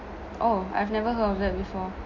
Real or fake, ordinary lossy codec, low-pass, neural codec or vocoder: real; MP3, 48 kbps; 7.2 kHz; none